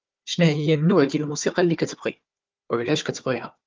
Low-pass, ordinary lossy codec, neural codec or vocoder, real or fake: 7.2 kHz; Opus, 32 kbps; codec, 16 kHz, 4 kbps, FunCodec, trained on Chinese and English, 50 frames a second; fake